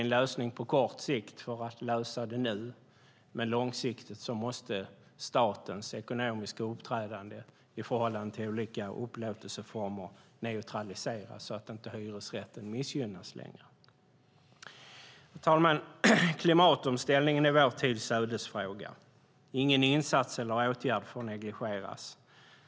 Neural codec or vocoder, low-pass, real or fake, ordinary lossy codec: none; none; real; none